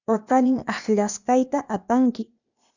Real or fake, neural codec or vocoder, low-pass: fake; codec, 16 kHz, 0.8 kbps, ZipCodec; 7.2 kHz